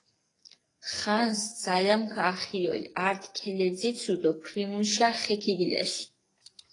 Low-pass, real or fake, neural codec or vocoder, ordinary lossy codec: 10.8 kHz; fake; codec, 44.1 kHz, 2.6 kbps, SNAC; AAC, 32 kbps